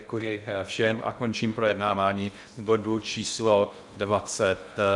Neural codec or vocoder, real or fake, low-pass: codec, 16 kHz in and 24 kHz out, 0.8 kbps, FocalCodec, streaming, 65536 codes; fake; 10.8 kHz